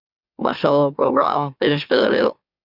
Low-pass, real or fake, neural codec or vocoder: 5.4 kHz; fake; autoencoder, 44.1 kHz, a latent of 192 numbers a frame, MeloTTS